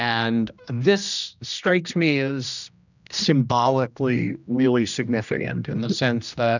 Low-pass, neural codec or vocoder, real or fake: 7.2 kHz; codec, 16 kHz, 1 kbps, X-Codec, HuBERT features, trained on general audio; fake